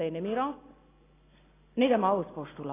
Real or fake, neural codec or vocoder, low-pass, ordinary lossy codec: real; none; 3.6 kHz; AAC, 16 kbps